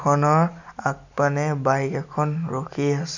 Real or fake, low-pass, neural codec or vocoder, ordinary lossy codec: real; 7.2 kHz; none; none